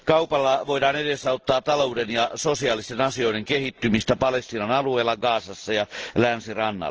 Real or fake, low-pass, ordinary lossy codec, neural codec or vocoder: real; 7.2 kHz; Opus, 16 kbps; none